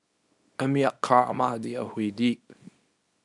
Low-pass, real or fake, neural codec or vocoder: 10.8 kHz; fake; codec, 24 kHz, 0.9 kbps, WavTokenizer, small release